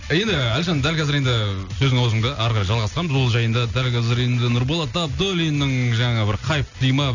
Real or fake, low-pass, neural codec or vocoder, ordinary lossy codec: real; 7.2 kHz; none; none